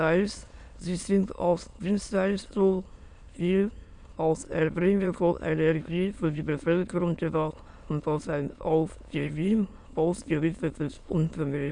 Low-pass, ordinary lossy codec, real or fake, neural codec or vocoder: 9.9 kHz; Opus, 64 kbps; fake; autoencoder, 22.05 kHz, a latent of 192 numbers a frame, VITS, trained on many speakers